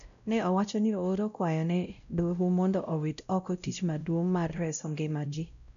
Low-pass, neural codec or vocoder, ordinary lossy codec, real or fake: 7.2 kHz; codec, 16 kHz, 0.5 kbps, X-Codec, WavLM features, trained on Multilingual LibriSpeech; none; fake